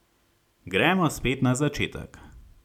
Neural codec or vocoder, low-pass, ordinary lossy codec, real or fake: none; 19.8 kHz; none; real